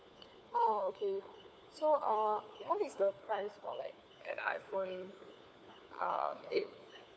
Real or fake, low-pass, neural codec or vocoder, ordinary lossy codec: fake; none; codec, 16 kHz, 4 kbps, FunCodec, trained on LibriTTS, 50 frames a second; none